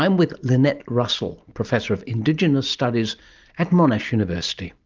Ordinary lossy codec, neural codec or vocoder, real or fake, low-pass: Opus, 24 kbps; none; real; 7.2 kHz